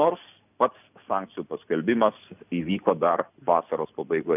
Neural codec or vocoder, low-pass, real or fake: none; 3.6 kHz; real